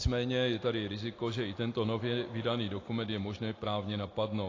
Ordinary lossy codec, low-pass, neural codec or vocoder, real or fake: AAC, 32 kbps; 7.2 kHz; none; real